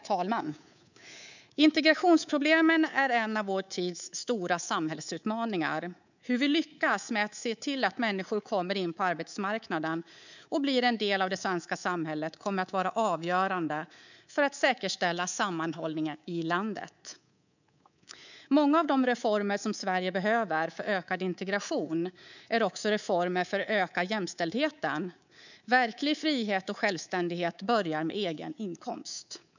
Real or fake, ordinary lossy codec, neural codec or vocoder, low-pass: fake; none; codec, 24 kHz, 3.1 kbps, DualCodec; 7.2 kHz